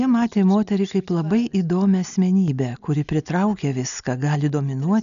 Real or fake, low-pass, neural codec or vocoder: real; 7.2 kHz; none